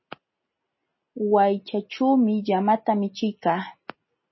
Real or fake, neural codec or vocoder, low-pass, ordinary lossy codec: real; none; 7.2 kHz; MP3, 24 kbps